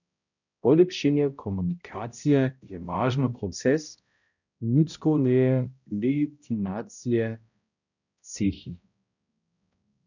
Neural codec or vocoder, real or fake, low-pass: codec, 16 kHz, 0.5 kbps, X-Codec, HuBERT features, trained on balanced general audio; fake; 7.2 kHz